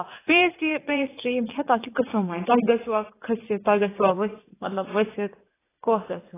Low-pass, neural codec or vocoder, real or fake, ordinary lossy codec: 3.6 kHz; vocoder, 44.1 kHz, 80 mel bands, Vocos; fake; AAC, 16 kbps